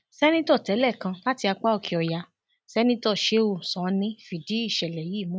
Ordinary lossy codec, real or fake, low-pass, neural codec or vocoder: none; real; none; none